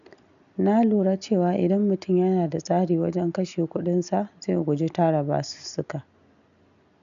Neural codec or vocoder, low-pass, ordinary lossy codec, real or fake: none; 7.2 kHz; none; real